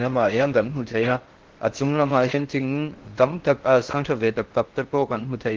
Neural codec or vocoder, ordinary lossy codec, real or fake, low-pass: codec, 16 kHz in and 24 kHz out, 0.6 kbps, FocalCodec, streaming, 4096 codes; Opus, 16 kbps; fake; 7.2 kHz